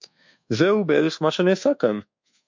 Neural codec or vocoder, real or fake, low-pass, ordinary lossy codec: codec, 24 kHz, 1.2 kbps, DualCodec; fake; 7.2 kHz; MP3, 64 kbps